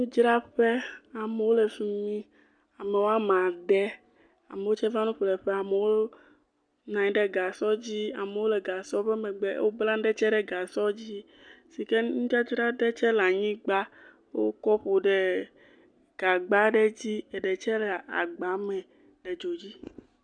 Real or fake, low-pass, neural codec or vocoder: real; 9.9 kHz; none